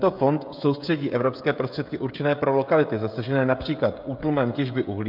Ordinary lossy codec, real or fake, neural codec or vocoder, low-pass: MP3, 48 kbps; fake; codec, 16 kHz, 16 kbps, FreqCodec, smaller model; 5.4 kHz